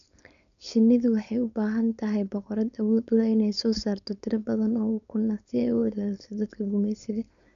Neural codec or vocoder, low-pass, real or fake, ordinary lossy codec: codec, 16 kHz, 4.8 kbps, FACodec; 7.2 kHz; fake; MP3, 96 kbps